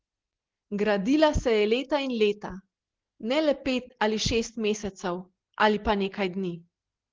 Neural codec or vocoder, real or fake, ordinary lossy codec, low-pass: none; real; Opus, 16 kbps; 7.2 kHz